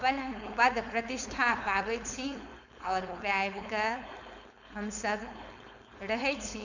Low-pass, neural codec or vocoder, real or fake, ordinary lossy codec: 7.2 kHz; codec, 16 kHz, 4.8 kbps, FACodec; fake; none